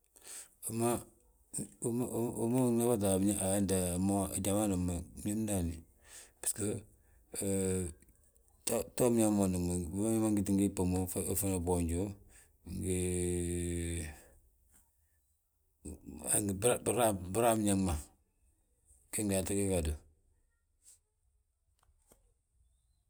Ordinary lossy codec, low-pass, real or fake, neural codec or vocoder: none; none; real; none